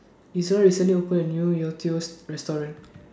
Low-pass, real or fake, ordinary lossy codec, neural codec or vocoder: none; real; none; none